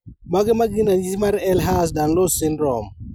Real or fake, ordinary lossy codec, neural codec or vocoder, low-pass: real; none; none; none